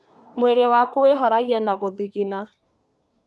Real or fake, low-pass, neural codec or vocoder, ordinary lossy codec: fake; none; codec, 24 kHz, 1 kbps, SNAC; none